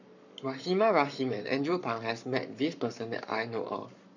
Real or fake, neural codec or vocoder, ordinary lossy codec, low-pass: fake; codec, 44.1 kHz, 7.8 kbps, Pupu-Codec; none; 7.2 kHz